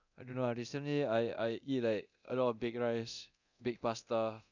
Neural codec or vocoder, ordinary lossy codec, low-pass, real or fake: codec, 24 kHz, 0.9 kbps, DualCodec; none; 7.2 kHz; fake